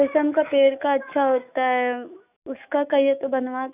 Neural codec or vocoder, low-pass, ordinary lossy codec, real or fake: autoencoder, 48 kHz, 128 numbers a frame, DAC-VAE, trained on Japanese speech; 3.6 kHz; none; fake